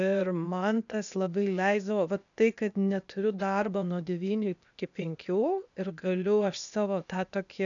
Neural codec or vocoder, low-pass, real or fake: codec, 16 kHz, 0.8 kbps, ZipCodec; 7.2 kHz; fake